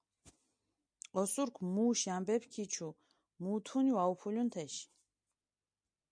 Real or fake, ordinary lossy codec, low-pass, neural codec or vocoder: real; MP3, 64 kbps; 9.9 kHz; none